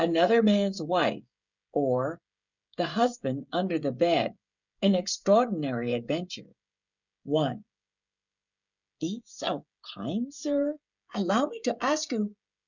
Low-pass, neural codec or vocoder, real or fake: 7.2 kHz; codec, 16 kHz, 16 kbps, FreqCodec, smaller model; fake